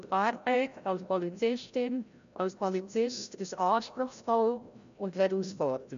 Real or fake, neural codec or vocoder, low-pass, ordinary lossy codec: fake; codec, 16 kHz, 0.5 kbps, FreqCodec, larger model; 7.2 kHz; MP3, 64 kbps